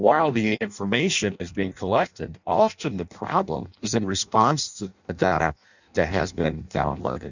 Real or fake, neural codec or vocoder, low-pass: fake; codec, 16 kHz in and 24 kHz out, 0.6 kbps, FireRedTTS-2 codec; 7.2 kHz